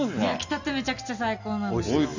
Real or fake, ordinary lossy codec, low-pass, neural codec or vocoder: real; none; 7.2 kHz; none